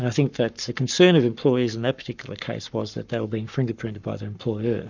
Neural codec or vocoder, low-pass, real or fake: codec, 44.1 kHz, 7.8 kbps, Pupu-Codec; 7.2 kHz; fake